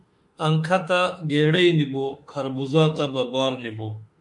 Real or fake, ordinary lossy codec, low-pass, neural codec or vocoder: fake; MP3, 64 kbps; 10.8 kHz; autoencoder, 48 kHz, 32 numbers a frame, DAC-VAE, trained on Japanese speech